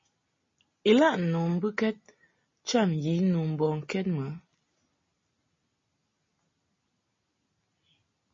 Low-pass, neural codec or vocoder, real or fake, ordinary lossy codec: 7.2 kHz; none; real; MP3, 32 kbps